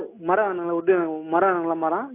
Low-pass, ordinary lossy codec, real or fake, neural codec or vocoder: 3.6 kHz; AAC, 24 kbps; real; none